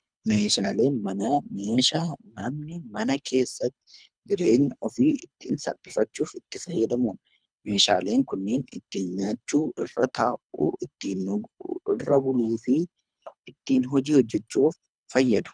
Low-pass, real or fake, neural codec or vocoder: 9.9 kHz; fake; codec, 24 kHz, 3 kbps, HILCodec